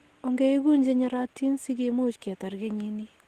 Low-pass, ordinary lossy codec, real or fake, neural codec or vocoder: 14.4 kHz; Opus, 16 kbps; real; none